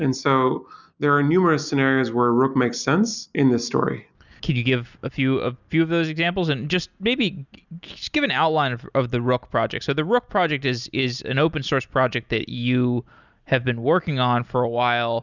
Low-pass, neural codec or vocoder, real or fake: 7.2 kHz; none; real